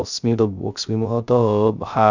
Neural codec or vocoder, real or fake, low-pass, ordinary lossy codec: codec, 16 kHz, 0.3 kbps, FocalCodec; fake; 7.2 kHz; none